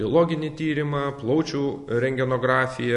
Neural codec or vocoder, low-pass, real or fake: none; 10.8 kHz; real